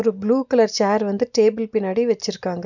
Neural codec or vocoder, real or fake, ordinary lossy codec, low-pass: none; real; none; 7.2 kHz